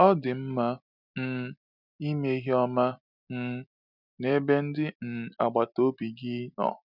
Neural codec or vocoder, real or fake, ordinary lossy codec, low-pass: none; real; none; 5.4 kHz